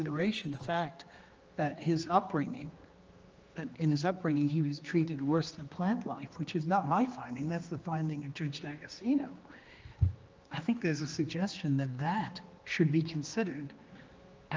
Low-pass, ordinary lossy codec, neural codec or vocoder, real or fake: 7.2 kHz; Opus, 24 kbps; codec, 16 kHz, 2 kbps, X-Codec, HuBERT features, trained on general audio; fake